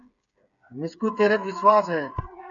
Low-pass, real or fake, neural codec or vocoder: 7.2 kHz; fake; codec, 16 kHz, 8 kbps, FreqCodec, smaller model